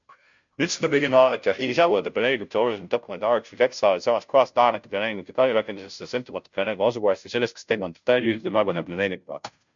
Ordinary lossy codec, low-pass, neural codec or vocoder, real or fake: MP3, 64 kbps; 7.2 kHz; codec, 16 kHz, 0.5 kbps, FunCodec, trained on Chinese and English, 25 frames a second; fake